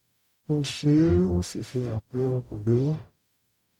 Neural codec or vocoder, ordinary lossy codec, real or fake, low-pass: codec, 44.1 kHz, 0.9 kbps, DAC; none; fake; 19.8 kHz